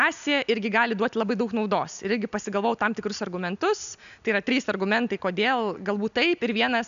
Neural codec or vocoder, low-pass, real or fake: none; 7.2 kHz; real